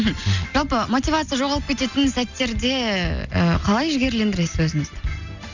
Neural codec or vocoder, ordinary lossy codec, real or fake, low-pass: none; none; real; 7.2 kHz